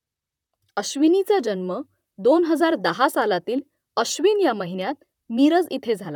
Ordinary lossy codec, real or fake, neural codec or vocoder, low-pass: none; fake; vocoder, 44.1 kHz, 128 mel bands, Pupu-Vocoder; 19.8 kHz